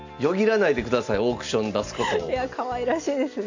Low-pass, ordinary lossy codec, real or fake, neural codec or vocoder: 7.2 kHz; AAC, 48 kbps; real; none